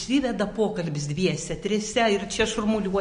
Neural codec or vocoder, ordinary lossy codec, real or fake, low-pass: none; MP3, 48 kbps; real; 9.9 kHz